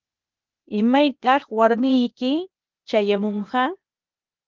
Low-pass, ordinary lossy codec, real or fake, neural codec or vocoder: 7.2 kHz; Opus, 24 kbps; fake; codec, 16 kHz, 0.8 kbps, ZipCodec